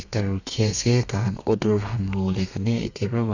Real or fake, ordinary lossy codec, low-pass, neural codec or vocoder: fake; none; 7.2 kHz; codec, 32 kHz, 1.9 kbps, SNAC